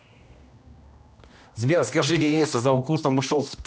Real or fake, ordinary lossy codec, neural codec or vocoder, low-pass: fake; none; codec, 16 kHz, 1 kbps, X-Codec, HuBERT features, trained on general audio; none